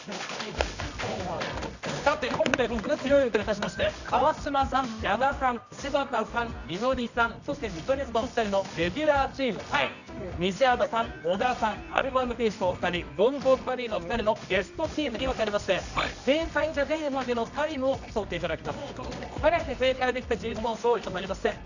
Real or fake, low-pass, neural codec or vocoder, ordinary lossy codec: fake; 7.2 kHz; codec, 24 kHz, 0.9 kbps, WavTokenizer, medium music audio release; none